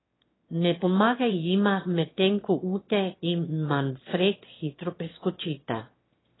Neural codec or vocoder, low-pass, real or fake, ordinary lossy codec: autoencoder, 22.05 kHz, a latent of 192 numbers a frame, VITS, trained on one speaker; 7.2 kHz; fake; AAC, 16 kbps